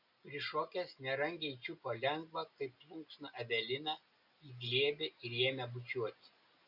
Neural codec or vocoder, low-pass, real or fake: none; 5.4 kHz; real